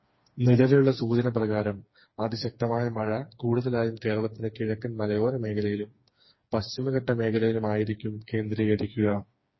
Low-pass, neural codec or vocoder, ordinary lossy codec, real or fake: 7.2 kHz; codec, 16 kHz, 4 kbps, FreqCodec, smaller model; MP3, 24 kbps; fake